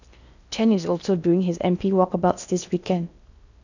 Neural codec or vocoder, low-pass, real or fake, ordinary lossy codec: codec, 16 kHz in and 24 kHz out, 0.8 kbps, FocalCodec, streaming, 65536 codes; 7.2 kHz; fake; none